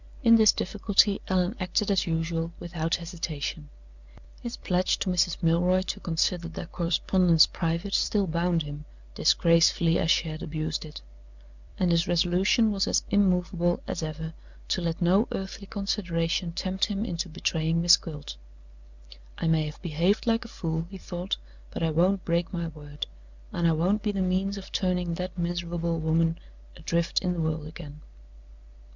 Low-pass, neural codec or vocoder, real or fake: 7.2 kHz; vocoder, 44.1 kHz, 128 mel bands every 256 samples, BigVGAN v2; fake